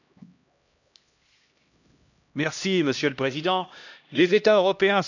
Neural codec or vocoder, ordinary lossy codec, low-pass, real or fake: codec, 16 kHz, 1 kbps, X-Codec, HuBERT features, trained on LibriSpeech; none; 7.2 kHz; fake